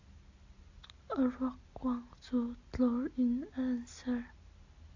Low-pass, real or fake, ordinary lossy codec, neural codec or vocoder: 7.2 kHz; real; AAC, 48 kbps; none